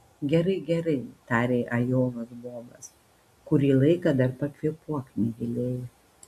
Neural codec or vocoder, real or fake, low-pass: none; real; 14.4 kHz